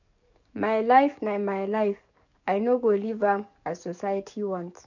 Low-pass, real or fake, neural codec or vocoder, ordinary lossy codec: 7.2 kHz; fake; codec, 44.1 kHz, 7.8 kbps, DAC; none